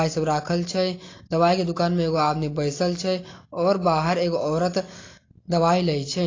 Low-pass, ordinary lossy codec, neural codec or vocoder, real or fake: 7.2 kHz; AAC, 32 kbps; none; real